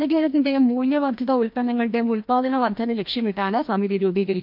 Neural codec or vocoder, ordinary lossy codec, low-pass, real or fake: codec, 16 kHz, 1 kbps, FreqCodec, larger model; MP3, 48 kbps; 5.4 kHz; fake